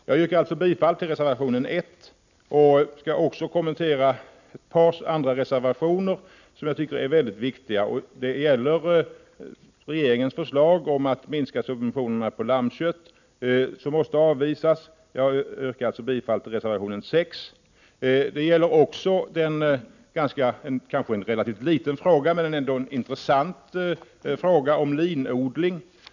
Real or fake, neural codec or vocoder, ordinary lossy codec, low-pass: real; none; none; 7.2 kHz